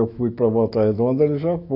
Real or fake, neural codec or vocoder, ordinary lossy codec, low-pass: fake; autoencoder, 48 kHz, 128 numbers a frame, DAC-VAE, trained on Japanese speech; AAC, 32 kbps; 5.4 kHz